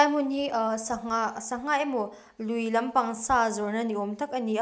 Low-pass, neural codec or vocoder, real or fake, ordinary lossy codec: none; none; real; none